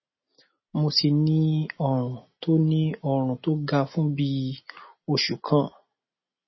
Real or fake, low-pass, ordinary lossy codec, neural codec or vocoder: real; 7.2 kHz; MP3, 24 kbps; none